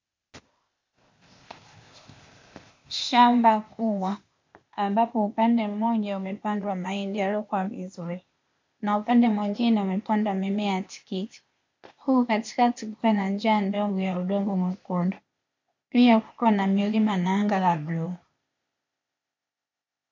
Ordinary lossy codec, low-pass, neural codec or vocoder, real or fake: MP3, 48 kbps; 7.2 kHz; codec, 16 kHz, 0.8 kbps, ZipCodec; fake